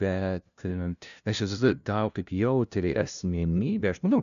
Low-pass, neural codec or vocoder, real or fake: 7.2 kHz; codec, 16 kHz, 0.5 kbps, FunCodec, trained on LibriTTS, 25 frames a second; fake